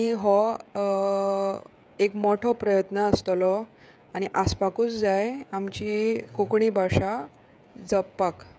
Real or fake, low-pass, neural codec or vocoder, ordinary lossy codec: fake; none; codec, 16 kHz, 16 kbps, FreqCodec, smaller model; none